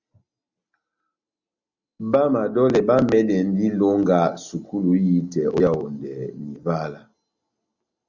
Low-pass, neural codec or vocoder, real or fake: 7.2 kHz; none; real